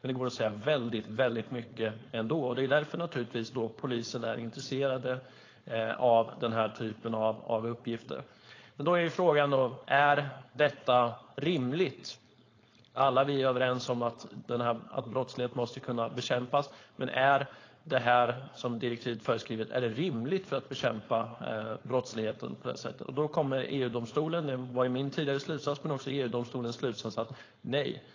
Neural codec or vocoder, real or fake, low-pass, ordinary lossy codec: codec, 16 kHz, 4.8 kbps, FACodec; fake; 7.2 kHz; AAC, 32 kbps